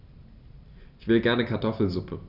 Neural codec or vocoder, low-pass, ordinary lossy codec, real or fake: none; 5.4 kHz; none; real